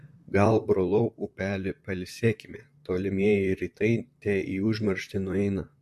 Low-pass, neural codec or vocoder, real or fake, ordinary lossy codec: 14.4 kHz; vocoder, 44.1 kHz, 128 mel bands, Pupu-Vocoder; fake; MP3, 64 kbps